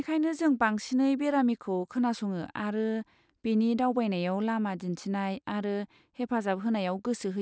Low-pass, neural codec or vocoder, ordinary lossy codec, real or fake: none; none; none; real